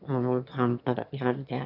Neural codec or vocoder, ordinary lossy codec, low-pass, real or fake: autoencoder, 22.05 kHz, a latent of 192 numbers a frame, VITS, trained on one speaker; none; 5.4 kHz; fake